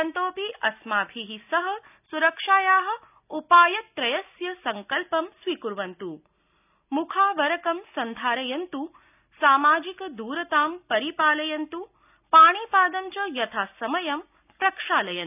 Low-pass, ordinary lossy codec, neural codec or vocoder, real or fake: 3.6 kHz; none; none; real